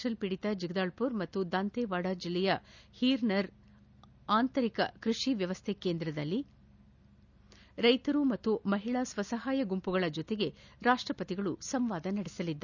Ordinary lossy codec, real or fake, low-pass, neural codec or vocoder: none; real; 7.2 kHz; none